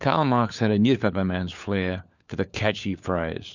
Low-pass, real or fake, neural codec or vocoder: 7.2 kHz; fake; codec, 16 kHz, 4 kbps, FunCodec, trained on LibriTTS, 50 frames a second